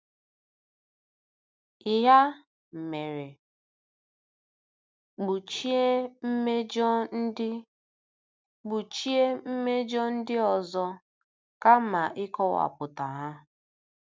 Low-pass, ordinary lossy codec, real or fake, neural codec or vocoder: none; none; real; none